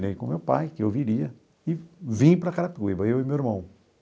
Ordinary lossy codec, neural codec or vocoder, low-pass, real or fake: none; none; none; real